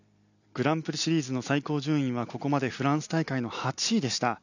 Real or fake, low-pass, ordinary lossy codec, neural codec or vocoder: real; 7.2 kHz; none; none